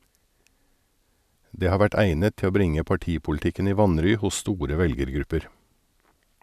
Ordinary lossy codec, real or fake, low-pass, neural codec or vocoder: none; real; 14.4 kHz; none